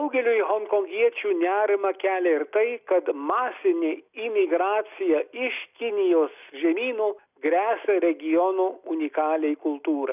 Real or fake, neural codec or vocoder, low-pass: real; none; 3.6 kHz